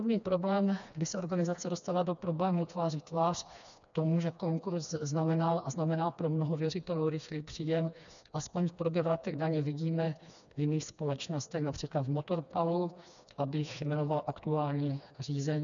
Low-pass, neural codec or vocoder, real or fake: 7.2 kHz; codec, 16 kHz, 2 kbps, FreqCodec, smaller model; fake